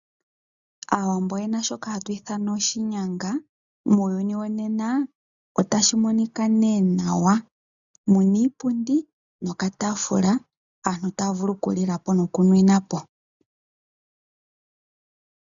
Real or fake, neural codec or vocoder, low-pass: real; none; 7.2 kHz